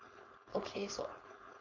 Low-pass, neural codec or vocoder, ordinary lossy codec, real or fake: 7.2 kHz; codec, 16 kHz, 4.8 kbps, FACodec; none; fake